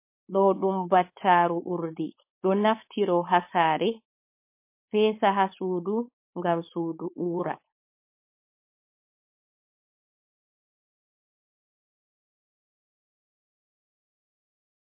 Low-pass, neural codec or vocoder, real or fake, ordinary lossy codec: 3.6 kHz; codec, 16 kHz, 4.8 kbps, FACodec; fake; MP3, 24 kbps